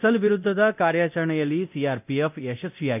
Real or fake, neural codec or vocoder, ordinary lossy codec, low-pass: fake; codec, 24 kHz, 0.9 kbps, DualCodec; none; 3.6 kHz